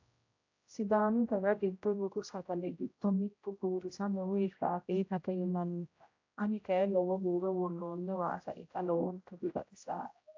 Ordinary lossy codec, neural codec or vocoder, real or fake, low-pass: AAC, 48 kbps; codec, 16 kHz, 0.5 kbps, X-Codec, HuBERT features, trained on general audio; fake; 7.2 kHz